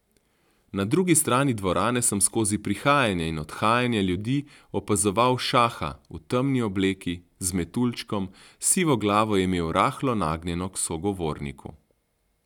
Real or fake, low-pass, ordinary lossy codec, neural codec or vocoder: real; 19.8 kHz; none; none